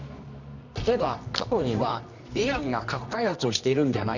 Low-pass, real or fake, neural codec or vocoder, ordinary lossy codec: 7.2 kHz; fake; codec, 24 kHz, 0.9 kbps, WavTokenizer, medium music audio release; none